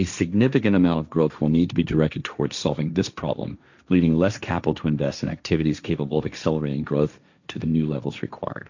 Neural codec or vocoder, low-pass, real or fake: codec, 16 kHz, 1.1 kbps, Voila-Tokenizer; 7.2 kHz; fake